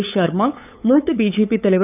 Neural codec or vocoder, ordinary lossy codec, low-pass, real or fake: codec, 16 kHz, 8 kbps, FunCodec, trained on LibriTTS, 25 frames a second; none; 3.6 kHz; fake